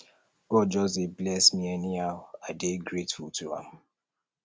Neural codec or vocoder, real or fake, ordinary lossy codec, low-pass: none; real; none; none